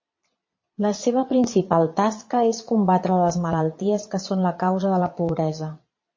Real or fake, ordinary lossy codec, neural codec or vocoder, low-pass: fake; MP3, 32 kbps; vocoder, 22.05 kHz, 80 mel bands, Vocos; 7.2 kHz